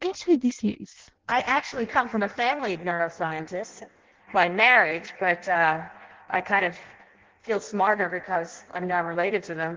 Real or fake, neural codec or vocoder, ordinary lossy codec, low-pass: fake; codec, 16 kHz in and 24 kHz out, 0.6 kbps, FireRedTTS-2 codec; Opus, 16 kbps; 7.2 kHz